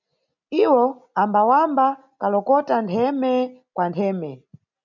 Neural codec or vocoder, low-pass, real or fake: none; 7.2 kHz; real